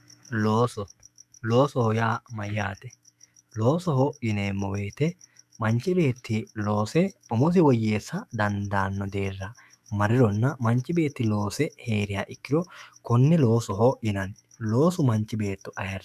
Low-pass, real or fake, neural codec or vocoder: 14.4 kHz; fake; autoencoder, 48 kHz, 128 numbers a frame, DAC-VAE, trained on Japanese speech